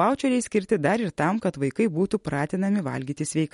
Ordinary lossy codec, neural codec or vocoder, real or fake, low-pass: MP3, 48 kbps; none; real; 19.8 kHz